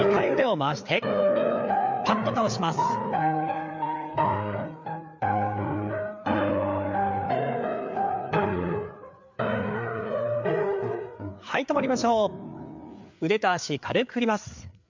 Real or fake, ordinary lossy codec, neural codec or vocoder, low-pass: fake; MP3, 64 kbps; codec, 16 kHz, 4 kbps, FreqCodec, larger model; 7.2 kHz